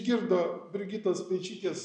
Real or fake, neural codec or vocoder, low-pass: real; none; 10.8 kHz